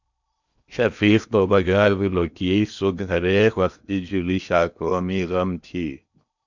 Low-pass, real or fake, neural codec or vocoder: 7.2 kHz; fake; codec, 16 kHz in and 24 kHz out, 0.8 kbps, FocalCodec, streaming, 65536 codes